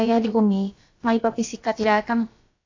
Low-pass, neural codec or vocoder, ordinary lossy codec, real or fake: 7.2 kHz; codec, 16 kHz, about 1 kbps, DyCAST, with the encoder's durations; AAC, 48 kbps; fake